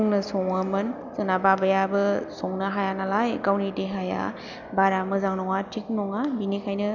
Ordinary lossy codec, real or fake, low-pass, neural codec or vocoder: none; real; 7.2 kHz; none